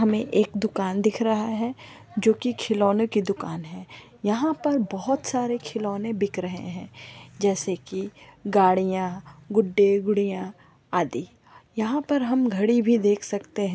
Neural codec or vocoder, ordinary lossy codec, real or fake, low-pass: none; none; real; none